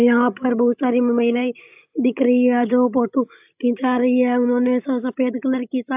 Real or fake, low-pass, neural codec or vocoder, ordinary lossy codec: fake; 3.6 kHz; codec, 16 kHz, 16 kbps, FreqCodec, larger model; AAC, 32 kbps